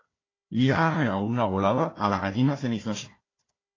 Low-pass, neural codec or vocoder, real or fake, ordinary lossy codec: 7.2 kHz; codec, 16 kHz, 1 kbps, FunCodec, trained on Chinese and English, 50 frames a second; fake; AAC, 32 kbps